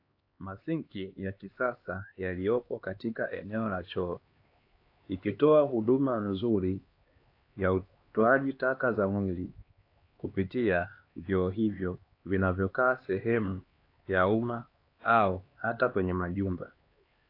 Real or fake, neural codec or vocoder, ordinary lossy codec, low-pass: fake; codec, 16 kHz, 2 kbps, X-Codec, HuBERT features, trained on LibriSpeech; AAC, 32 kbps; 5.4 kHz